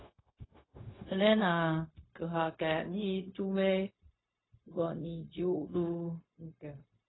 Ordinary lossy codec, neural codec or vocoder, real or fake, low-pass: AAC, 16 kbps; codec, 16 kHz, 0.4 kbps, LongCat-Audio-Codec; fake; 7.2 kHz